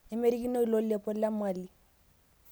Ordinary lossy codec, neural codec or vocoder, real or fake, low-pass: none; none; real; none